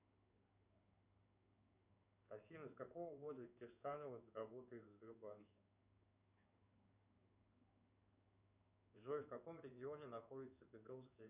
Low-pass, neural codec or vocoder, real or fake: 3.6 kHz; codec, 16 kHz in and 24 kHz out, 1 kbps, XY-Tokenizer; fake